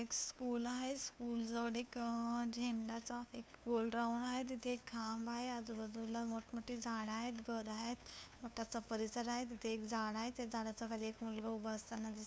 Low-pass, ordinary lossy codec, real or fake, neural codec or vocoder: none; none; fake; codec, 16 kHz, 2 kbps, FunCodec, trained on LibriTTS, 25 frames a second